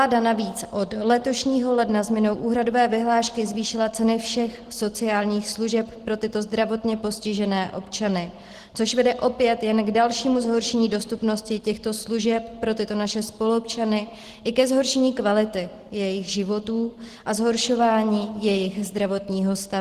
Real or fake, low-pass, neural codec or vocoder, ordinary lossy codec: real; 14.4 kHz; none; Opus, 16 kbps